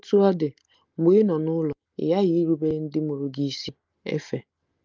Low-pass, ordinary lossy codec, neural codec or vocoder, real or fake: 7.2 kHz; Opus, 24 kbps; none; real